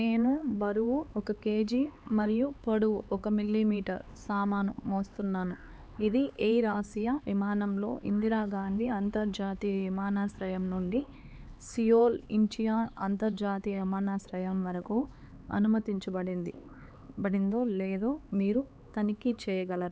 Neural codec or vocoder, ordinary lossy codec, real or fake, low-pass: codec, 16 kHz, 4 kbps, X-Codec, HuBERT features, trained on LibriSpeech; none; fake; none